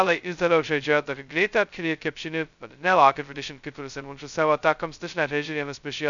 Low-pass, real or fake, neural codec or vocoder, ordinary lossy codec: 7.2 kHz; fake; codec, 16 kHz, 0.2 kbps, FocalCodec; MP3, 96 kbps